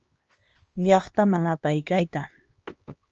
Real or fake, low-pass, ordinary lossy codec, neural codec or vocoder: fake; 7.2 kHz; Opus, 24 kbps; codec, 16 kHz, 2 kbps, X-Codec, HuBERT features, trained on LibriSpeech